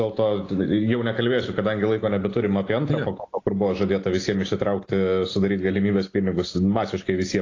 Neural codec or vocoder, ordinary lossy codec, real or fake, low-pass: none; AAC, 32 kbps; real; 7.2 kHz